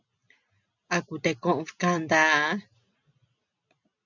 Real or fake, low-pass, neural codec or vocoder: real; 7.2 kHz; none